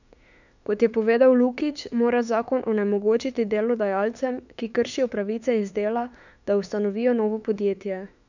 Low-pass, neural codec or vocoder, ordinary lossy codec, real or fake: 7.2 kHz; autoencoder, 48 kHz, 32 numbers a frame, DAC-VAE, trained on Japanese speech; none; fake